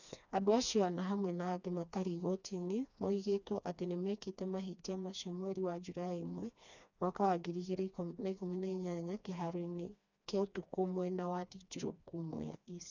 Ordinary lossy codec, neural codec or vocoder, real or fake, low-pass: none; codec, 16 kHz, 2 kbps, FreqCodec, smaller model; fake; 7.2 kHz